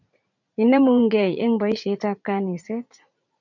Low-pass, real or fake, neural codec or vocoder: 7.2 kHz; fake; vocoder, 44.1 kHz, 80 mel bands, Vocos